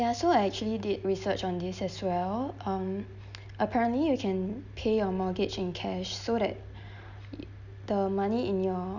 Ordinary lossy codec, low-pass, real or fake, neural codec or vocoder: none; 7.2 kHz; real; none